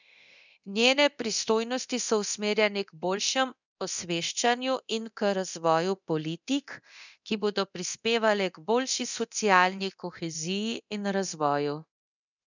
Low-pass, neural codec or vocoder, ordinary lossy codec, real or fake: 7.2 kHz; codec, 24 kHz, 0.9 kbps, DualCodec; none; fake